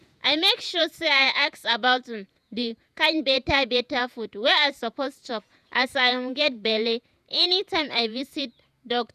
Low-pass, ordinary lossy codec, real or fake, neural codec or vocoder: 14.4 kHz; none; fake; vocoder, 48 kHz, 128 mel bands, Vocos